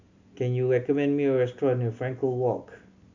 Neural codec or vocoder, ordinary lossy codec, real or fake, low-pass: none; AAC, 48 kbps; real; 7.2 kHz